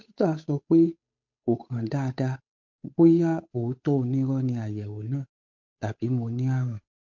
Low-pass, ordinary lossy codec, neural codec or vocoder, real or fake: 7.2 kHz; MP3, 48 kbps; codec, 16 kHz, 8 kbps, FunCodec, trained on Chinese and English, 25 frames a second; fake